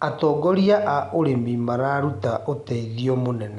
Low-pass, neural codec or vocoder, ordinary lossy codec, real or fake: 10.8 kHz; none; none; real